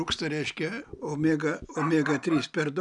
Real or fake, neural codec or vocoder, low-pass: real; none; 10.8 kHz